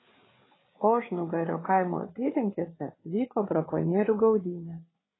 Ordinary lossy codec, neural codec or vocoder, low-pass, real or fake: AAC, 16 kbps; codec, 16 kHz, 4 kbps, FreqCodec, larger model; 7.2 kHz; fake